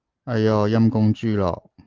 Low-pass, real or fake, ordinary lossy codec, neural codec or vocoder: 7.2 kHz; real; Opus, 16 kbps; none